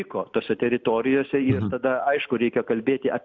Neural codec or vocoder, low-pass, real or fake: none; 7.2 kHz; real